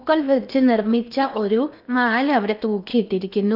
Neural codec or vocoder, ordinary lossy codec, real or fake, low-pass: codec, 16 kHz in and 24 kHz out, 0.8 kbps, FocalCodec, streaming, 65536 codes; none; fake; 5.4 kHz